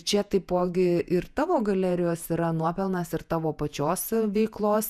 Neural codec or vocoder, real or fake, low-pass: vocoder, 48 kHz, 128 mel bands, Vocos; fake; 14.4 kHz